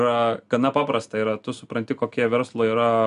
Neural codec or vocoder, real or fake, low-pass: none; real; 10.8 kHz